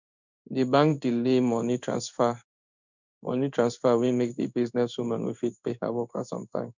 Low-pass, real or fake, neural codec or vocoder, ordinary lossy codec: 7.2 kHz; fake; codec, 16 kHz in and 24 kHz out, 1 kbps, XY-Tokenizer; none